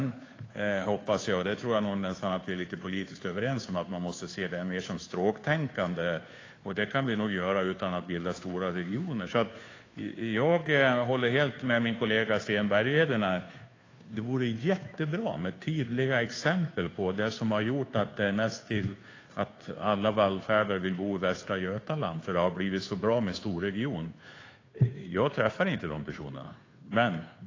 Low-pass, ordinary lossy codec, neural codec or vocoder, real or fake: 7.2 kHz; AAC, 32 kbps; codec, 16 kHz, 2 kbps, FunCodec, trained on Chinese and English, 25 frames a second; fake